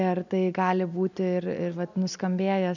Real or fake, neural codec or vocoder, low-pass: real; none; 7.2 kHz